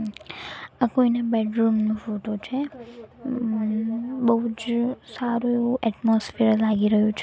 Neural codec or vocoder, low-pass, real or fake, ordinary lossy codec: none; none; real; none